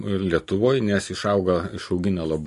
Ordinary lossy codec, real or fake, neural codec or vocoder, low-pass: MP3, 48 kbps; real; none; 14.4 kHz